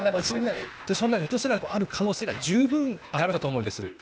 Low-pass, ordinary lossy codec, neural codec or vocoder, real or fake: none; none; codec, 16 kHz, 0.8 kbps, ZipCodec; fake